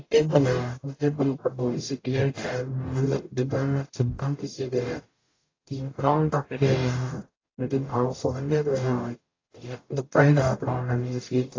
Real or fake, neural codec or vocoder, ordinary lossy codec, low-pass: fake; codec, 44.1 kHz, 0.9 kbps, DAC; AAC, 32 kbps; 7.2 kHz